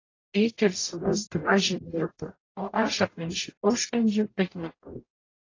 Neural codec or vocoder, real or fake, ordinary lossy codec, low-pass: codec, 44.1 kHz, 0.9 kbps, DAC; fake; AAC, 32 kbps; 7.2 kHz